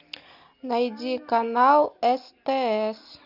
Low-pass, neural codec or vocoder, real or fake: 5.4 kHz; none; real